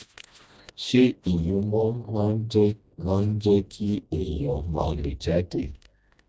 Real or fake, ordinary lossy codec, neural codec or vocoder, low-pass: fake; none; codec, 16 kHz, 1 kbps, FreqCodec, smaller model; none